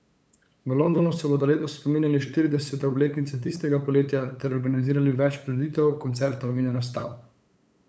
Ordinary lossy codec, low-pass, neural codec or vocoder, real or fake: none; none; codec, 16 kHz, 8 kbps, FunCodec, trained on LibriTTS, 25 frames a second; fake